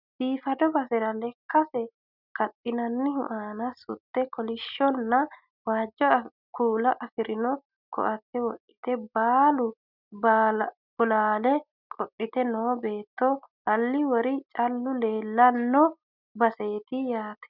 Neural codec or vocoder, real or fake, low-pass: none; real; 5.4 kHz